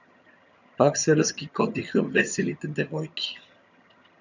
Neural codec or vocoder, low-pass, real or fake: vocoder, 22.05 kHz, 80 mel bands, HiFi-GAN; 7.2 kHz; fake